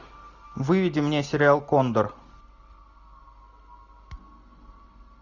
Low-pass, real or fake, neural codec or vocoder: 7.2 kHz; real; none